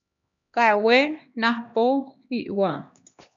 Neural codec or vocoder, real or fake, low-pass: codec, 16 kHz, 1 kbps, X-Codec, HuBERT features, trained on LibriSpeech; fake; 7.2 kHz